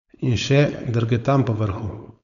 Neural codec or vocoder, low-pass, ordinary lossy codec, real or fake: codec, 16 kHz, 4.8 kbps, FACodec; 7.2 kHz; none; fake